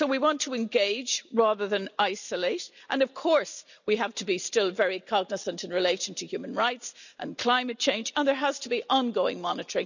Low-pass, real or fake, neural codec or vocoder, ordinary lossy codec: 7.2 kHz; real; none; none